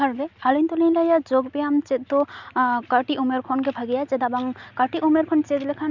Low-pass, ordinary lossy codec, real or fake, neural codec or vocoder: 7.2 kHz; none; real; none